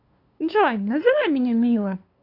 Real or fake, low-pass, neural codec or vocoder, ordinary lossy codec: fake; 5.4 kHz; codec, 16 kHz, 2 kbps, FunCodec, trained on LibriTTS, 25 frames a second; AAC, 32 kbps